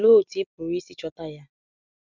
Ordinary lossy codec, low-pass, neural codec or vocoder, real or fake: none; 7.2 kHz; none; real